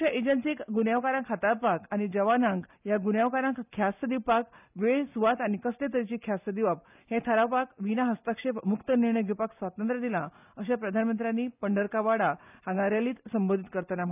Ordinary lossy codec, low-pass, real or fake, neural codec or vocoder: none; 3.6 kHz; real; none